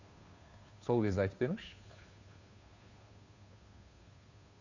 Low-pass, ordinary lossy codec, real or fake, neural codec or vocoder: 7.2 kHz; AAC, 48 kbps; fake; codec, 16 kHz, 2 kbps, FunCodec, trained on Chinese and English, 25 frames a second